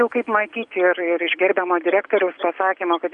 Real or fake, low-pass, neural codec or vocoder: real; 10.8 kHz; none